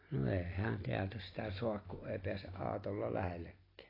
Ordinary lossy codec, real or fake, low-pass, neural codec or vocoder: AAC, 24 kbps; real; 5.4 kHz; none